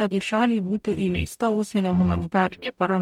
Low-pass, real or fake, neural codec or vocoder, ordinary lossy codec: 19.8 kHz; fake; codec, 44.1 kHz, 0.9 kbps, DAC; MP3, 96 kbps